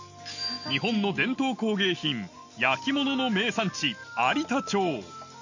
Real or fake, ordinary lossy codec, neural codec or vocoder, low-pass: real; none; none; 7.2 kHz